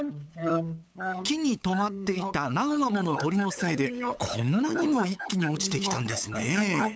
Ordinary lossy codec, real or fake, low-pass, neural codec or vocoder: none; fake; none; codec, 16 kHz, 8 kbps, FunCodec, trained on LibriTTS, 25 frames a second